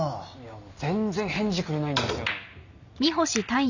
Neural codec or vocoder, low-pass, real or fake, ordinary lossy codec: none; 7.2 kHz; real; none